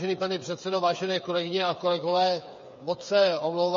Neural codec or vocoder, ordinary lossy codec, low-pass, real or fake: codec, 16 kHz, 8 kbps, FreqCodec, smaller model; MP3, 32 kbps; 7.2 kHz; fake